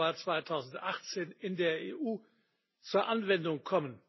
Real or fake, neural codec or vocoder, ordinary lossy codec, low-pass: real; none; MP3, 24 kbps; 7.2 kHz